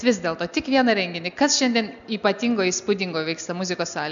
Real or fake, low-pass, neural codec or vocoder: real; 7.2 kHz; none